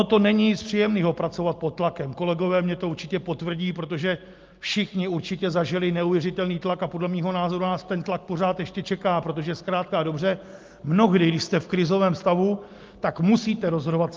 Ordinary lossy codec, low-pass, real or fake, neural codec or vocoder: Opus, 32 kbps; 7.2 kHz; real; none